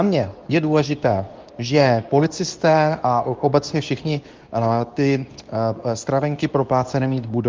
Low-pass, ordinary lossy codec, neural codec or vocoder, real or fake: 7.2 kHz; Opus, 32 kbps; codec, 24 kHz, 0.9 kbps, WavTokenizer, medium speech release version 2; fake